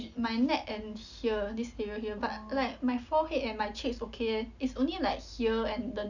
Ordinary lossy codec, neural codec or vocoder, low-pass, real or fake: none; none; 7.2 kHz; real